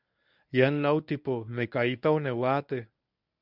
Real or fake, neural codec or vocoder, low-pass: fake; codec, 24 kHz, 0.9 kbps, WavTokenizer, medium speech release version 1; 5.4 kHz